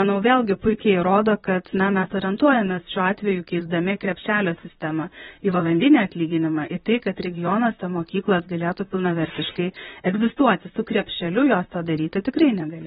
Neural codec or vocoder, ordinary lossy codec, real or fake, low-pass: vocoder, 44.1 kHz, 128 mel bands, Pupu-Vocoder; AAC, 16 kbps; fake; 19.8 kHz